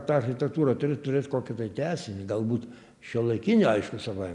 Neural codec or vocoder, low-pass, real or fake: none; 10.8 kHz; real